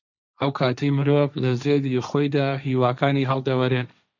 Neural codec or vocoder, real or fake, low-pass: codec, 16 kHz, 1.1 kbps, Voila-Tokenizer; fake; 7.2 kHz